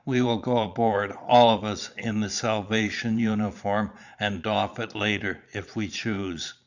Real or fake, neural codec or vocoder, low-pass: fake; vocoder, 22.05 kHz, 80 mel bands, Vocos; 7.2 kHz